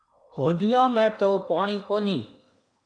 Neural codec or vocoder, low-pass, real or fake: codec, 16 kHz in and 24 kHz out, 0.8 kbps, FocalCodec, streaming, 65536 codes; 9.9 kHz; fake